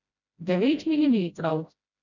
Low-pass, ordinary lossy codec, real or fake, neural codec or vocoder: 7.2 kHz; none; fake; codec, 16 kHz, 0.5 kbps, FreqCodec, smaller model